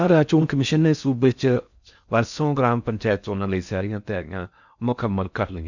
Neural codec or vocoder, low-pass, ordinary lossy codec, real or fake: codec, 16 kHz in and 24 kHz out, 0.6 kbps, FocalCodec, streaming, 4096 codes; 7.2 kHz; none; fake